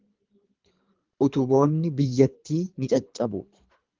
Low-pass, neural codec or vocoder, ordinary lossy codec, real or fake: 7.2 kHz; codec, 24 kHz, 3 kbps, HILCodec; Opus, 32 kbps; fake